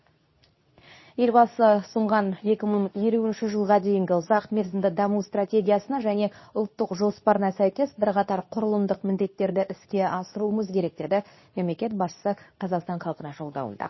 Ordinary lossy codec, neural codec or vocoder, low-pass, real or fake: MP3, 24 kbps; codec, 24 kHz, 0.9 kbps, WavTokenizer, medium speech release version 2; 7.2 kHz; fake